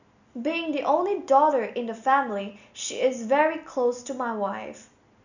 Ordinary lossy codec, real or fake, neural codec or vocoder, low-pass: none; real; none; 7.2 kHz